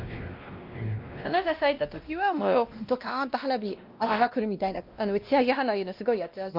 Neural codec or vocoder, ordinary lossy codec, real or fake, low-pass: codec, 16 kHz, 1 kbps, X-Codec, WavLM features, trained on Multilingual LibriSpeech; Opus, 24 kbps; fake; 5.4 kHz